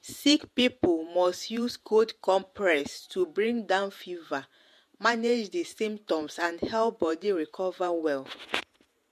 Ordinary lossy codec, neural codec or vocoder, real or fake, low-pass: MP3, 64 kbps; vocoder, 48 kHz, 128 mel bands, Vocos; fake; 14.4 kHz